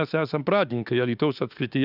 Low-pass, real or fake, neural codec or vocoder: 5.4 kHz; fake; autoencoder, 48 kHz, 32 numbers a frame, DAC-VAE, trained on Japanese speech